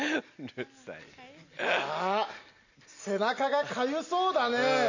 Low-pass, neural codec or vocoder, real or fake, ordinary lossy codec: 7.2 kHz; none; real; none